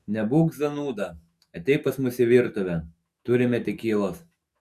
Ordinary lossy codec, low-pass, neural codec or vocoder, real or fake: Opus, 64 kbps; 14.4 kHz; autoencoder, 48 kHz, 128 numbers a frame, DAC-VAE, trained on Japanese speech; fake